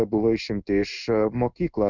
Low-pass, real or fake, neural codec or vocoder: 7.2 kHz; fake; codec, 16 kHz in and 24 kHz out, 1 kbps, XY-Tokenizer